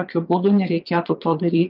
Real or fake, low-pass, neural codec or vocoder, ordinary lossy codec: fake; 5.4 kHz; vocoder, 22.05 kHz, 80 mel bands, Vocos; Opus, 24 kbps